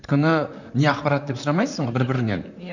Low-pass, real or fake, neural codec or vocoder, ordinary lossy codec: 7.2 kHz; fake; vocoder, 44.1 kHz, 128 mel bands, Pupu-Vocoder; none